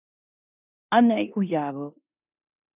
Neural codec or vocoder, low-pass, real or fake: codec, 16 kHz in and 24 kHz out, 0.9 kbps, LongCat-Audio-Codec, four codebook decoder; 3.6 kHz; fake